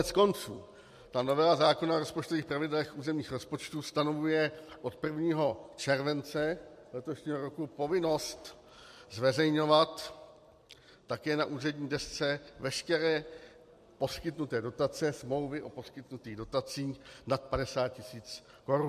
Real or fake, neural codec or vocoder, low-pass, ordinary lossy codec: real; none; 14.4 kHz; MP3, 64 kbps